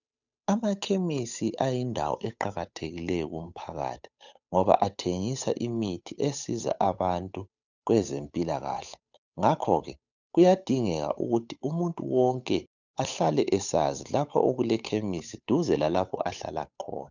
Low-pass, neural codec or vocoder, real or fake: 7.2 kHz; codec, 16 kHz, 8 kbps, FunCodec, trained on Chinese and English, 25 frames a second; fake